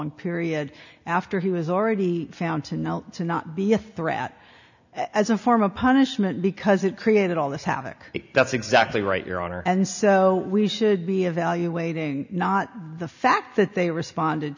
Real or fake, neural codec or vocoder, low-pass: real; none; 7.2 kHz